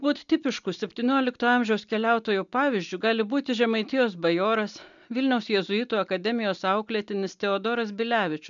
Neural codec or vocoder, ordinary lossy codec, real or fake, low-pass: none; MP3, 96 kbps; real; 7.2 kHz